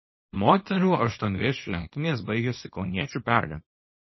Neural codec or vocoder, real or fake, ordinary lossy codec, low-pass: codec, 24 kHz, 0.9 kbps, WavTokenizer, small release; fake; MP3, 24 kbps; 7.2 kHz